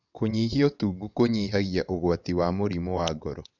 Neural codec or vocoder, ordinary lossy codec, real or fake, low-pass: vocoder, 22.05 kHz, 80 mel bands, WaveNeXt; none; fake; 7.2 kHz